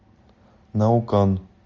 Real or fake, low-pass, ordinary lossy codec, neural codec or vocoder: real; 7.2 kHz; Opus, 32 kbps; none